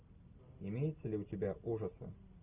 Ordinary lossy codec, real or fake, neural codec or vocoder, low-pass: Opus, 24 kbps; real; none; 3.6 kHz